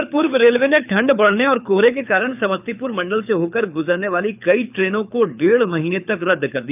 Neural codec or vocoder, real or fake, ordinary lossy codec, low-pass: codec, 24 kHz, 6 kbps, HILCodec; fake; none; 3.6 kHz